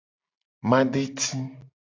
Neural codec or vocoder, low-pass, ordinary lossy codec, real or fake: none; 7.2 kHz; AAC, 32 kbps; real